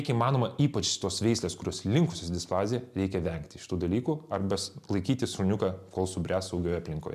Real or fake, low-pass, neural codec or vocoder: real; 14.4 kHz; none